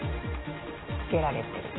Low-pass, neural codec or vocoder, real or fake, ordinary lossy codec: 7.2 kHz; none; real; AAC, 16 kbps